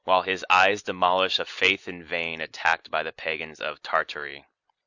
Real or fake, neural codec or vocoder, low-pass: real; none; 7.2 kHz